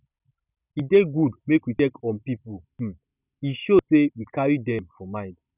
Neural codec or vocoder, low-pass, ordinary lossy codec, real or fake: none; 3.6 kHz; none; real